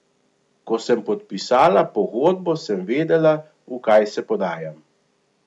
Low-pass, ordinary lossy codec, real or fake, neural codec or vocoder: 10.8 kHz; none; real; none